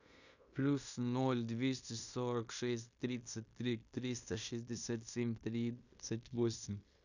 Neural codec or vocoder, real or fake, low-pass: codec, 16 kHz in and 24 kHz out, 0.9 kbps, LongCat-Audio-Codec, fine tuned four codebook decoder; fake; 7.2 kHz